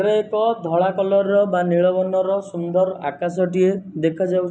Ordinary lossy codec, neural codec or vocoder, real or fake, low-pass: none; none; real; none